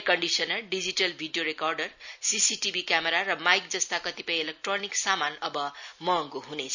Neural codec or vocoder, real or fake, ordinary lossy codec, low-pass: none; real; none; 7.2 kHz